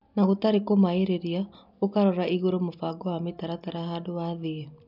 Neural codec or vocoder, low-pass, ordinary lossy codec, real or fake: none; 5.4 kHz; none; real